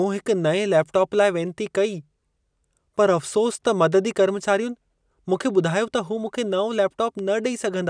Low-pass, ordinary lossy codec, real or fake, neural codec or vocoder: 9.9 kHz; none; real; none